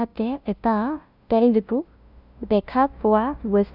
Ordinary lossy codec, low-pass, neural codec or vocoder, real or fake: none; 5.4 kHz; codec, 16 kHz, 0.5 kbps, FunCodec, trained on LibriTTS, 25 frames a second; fake